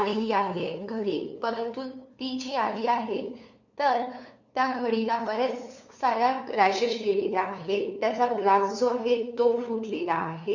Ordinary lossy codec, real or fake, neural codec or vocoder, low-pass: none; fake; codec, 16 kHz, 2 kbps, FunCodec, trained on LibriTTS, 25 frames a second; 7.2 kHz